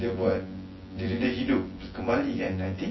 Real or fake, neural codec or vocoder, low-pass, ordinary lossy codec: fake; vocoder, 24 kHz, 100 mel bands, Vocos; 7.2 kHz; MP3, 24 kbps